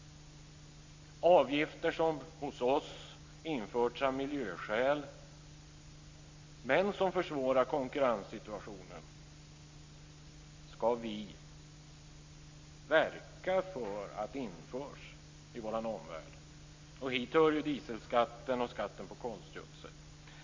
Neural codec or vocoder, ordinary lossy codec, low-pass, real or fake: none; MP3, 48 kbps; 7.2 kHz; real